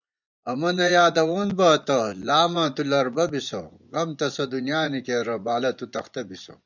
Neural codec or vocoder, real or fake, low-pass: vocoder, 24 kHz, 100 mel bands, Vocos; fake; 7.2 kHz